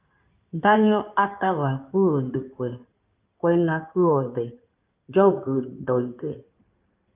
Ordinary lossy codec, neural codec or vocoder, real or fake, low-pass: Opus, 24 kbps; codec, 16 kHz in and 24 kHz out, 2.2 kbps, FireRedTTS-2 codec; fake; 3.6 kHz